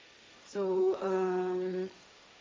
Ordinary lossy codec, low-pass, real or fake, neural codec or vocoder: none; none; fake; codec, 16 kHz, 1.1 kbps, Voila-Tokenizer